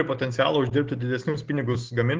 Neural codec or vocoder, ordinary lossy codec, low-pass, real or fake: none; Opus, 16 kbps; 7.2 kHz; real